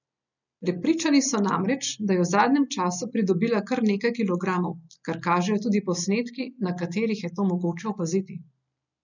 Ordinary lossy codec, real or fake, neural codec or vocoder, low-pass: none; real; none; 7.2 kHz